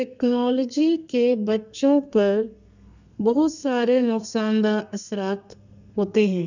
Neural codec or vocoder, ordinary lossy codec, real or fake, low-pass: codec, 32 kHz, 1.9 kbps, SNAC; none; fake; 7.2 kHz